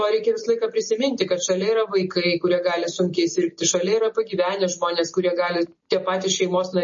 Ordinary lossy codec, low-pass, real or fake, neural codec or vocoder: MP3, 32 kbps; 7.2 kHz; real; none